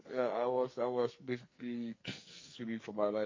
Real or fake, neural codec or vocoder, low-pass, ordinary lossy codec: fake; codec, 44.1 kHz, 2.6 kbps, SNAC; 7.2 kHz; MP3, 32 kbps